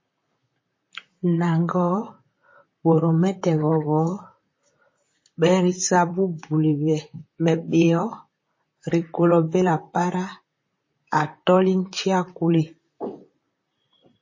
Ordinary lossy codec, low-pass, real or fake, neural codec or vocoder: MP3, 32 kbps; 7.2 kHz; fake; vocoder, 44.1 kHz, 128 mel bands, Pupu-Vocoder